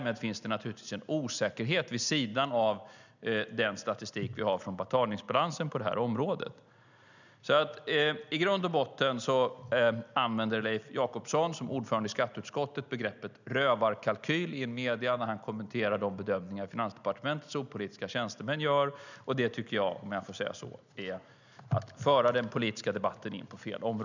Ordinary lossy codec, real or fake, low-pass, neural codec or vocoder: none; real; 7.2 kHz; none